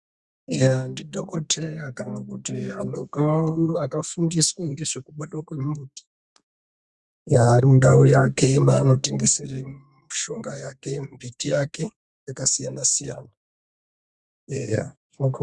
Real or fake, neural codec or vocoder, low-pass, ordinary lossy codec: fake; codec, 32 kHz, 1.9 kbps, SNAC; 10.8 kHz; Opus, 64 kbps